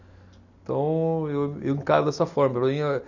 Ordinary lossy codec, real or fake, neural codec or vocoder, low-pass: none; real; none; 7.2 kHz